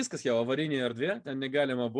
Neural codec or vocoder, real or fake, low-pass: none; real; 9.9 kHz